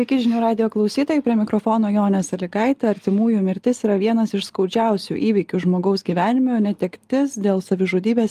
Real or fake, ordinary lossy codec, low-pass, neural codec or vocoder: real; Opus, 32 kbps; 14.4 kHz; none